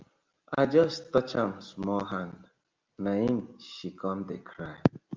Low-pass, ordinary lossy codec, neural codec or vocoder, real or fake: 7.2 kHz; Opus, 24 kbps; none; real